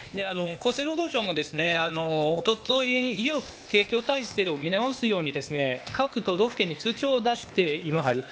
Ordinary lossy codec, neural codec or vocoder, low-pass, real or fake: none; codec, 16 kHz, 0.8 kbps, ZipCodec; none; fake